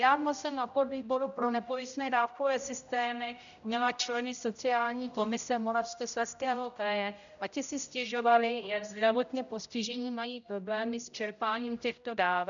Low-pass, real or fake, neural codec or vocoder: 7.2 kHz; fake; codec, 16 kHz, 0.5 kbps, X-Codec, HuBERT features, trained on general audio